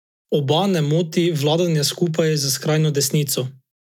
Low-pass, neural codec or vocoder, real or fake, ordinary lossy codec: 19.8 kHz; none; real; none